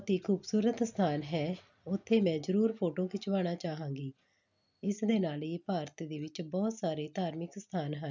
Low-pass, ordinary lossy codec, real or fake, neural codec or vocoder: 7.2 kHz; none; real; none